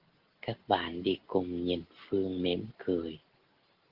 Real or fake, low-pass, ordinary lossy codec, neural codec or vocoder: real; 5.4 kHz; Opus, 16 kbps; none